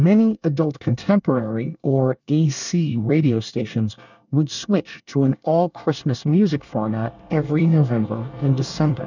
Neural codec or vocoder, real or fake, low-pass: codec, 24 kHz, 1 kbps, SNAC; fake; 7.2 kHz